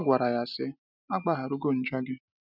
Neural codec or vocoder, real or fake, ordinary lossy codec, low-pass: none; real; none; 5.4 kHz